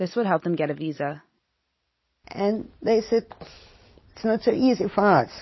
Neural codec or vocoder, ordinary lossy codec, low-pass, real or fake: none; MP3, 24 kbps; 7.2 kHz; real